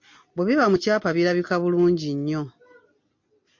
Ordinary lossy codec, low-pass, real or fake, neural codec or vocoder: MP3, 48 kbps; 7.2 kHz; real; none